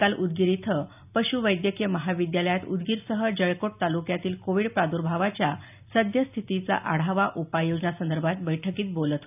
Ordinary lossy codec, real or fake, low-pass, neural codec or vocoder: none; real; 3.6 kHz; none